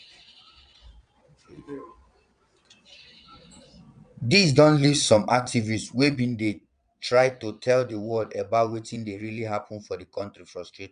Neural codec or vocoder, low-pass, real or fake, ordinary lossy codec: vocoder, 22.05 kHz, 80 mel bands, Vocos; 9.9 kHz; fake; none